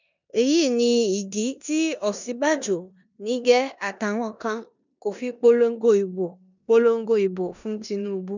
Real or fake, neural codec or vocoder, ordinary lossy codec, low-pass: fake; codec, 16 kHz in and 24 kHz out, 0.9 kbps, LongCat-Audio-Codec, four codebook decoder; none; 7.2 kHz